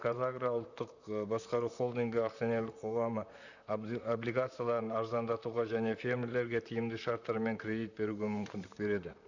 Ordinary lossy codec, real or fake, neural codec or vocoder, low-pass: none; fake; vocoder, 44.1 kHz, 128 mel bands, Pupu-Vocoder; 7.2 kHz